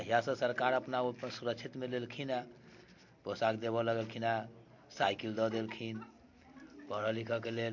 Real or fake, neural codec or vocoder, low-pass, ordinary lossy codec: real; none; 7.2 kHz; MP3, 48 kbps